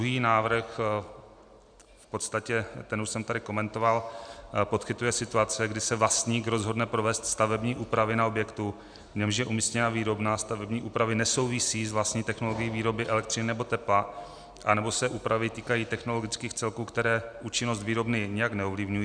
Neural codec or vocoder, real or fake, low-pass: none; real; 9.9 kHz